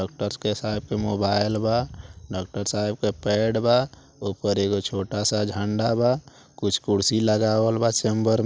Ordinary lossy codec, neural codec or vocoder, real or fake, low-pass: none; none; real; none